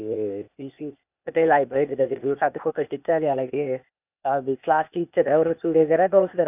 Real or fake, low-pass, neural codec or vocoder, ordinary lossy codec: fake; 3.6 kHz; codec, 16 kHz, 0.8 kbps, ZipCodec; none